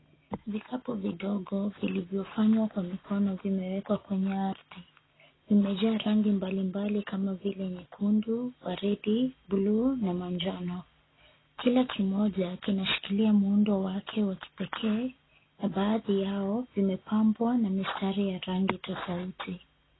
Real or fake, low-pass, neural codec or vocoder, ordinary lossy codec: real; 7.2 kHz; none; AAC, 16 kbps